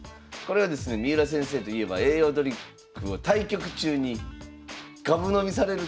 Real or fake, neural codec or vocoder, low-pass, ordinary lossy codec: real; none; none; none